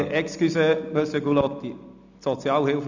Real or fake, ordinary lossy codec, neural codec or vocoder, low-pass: real; none; none; 7.2 kHz